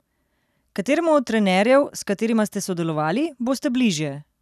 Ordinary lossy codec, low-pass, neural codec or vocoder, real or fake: none; 14.4 kHz; none; real